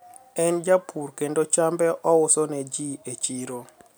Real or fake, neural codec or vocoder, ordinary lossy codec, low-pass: real; none; none; none